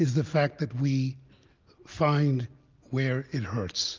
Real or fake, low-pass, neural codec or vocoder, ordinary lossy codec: real; 7.2 kHz; none; Opus, 32 kbps